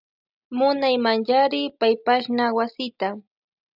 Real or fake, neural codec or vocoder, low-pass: real; none; 5.4 kHz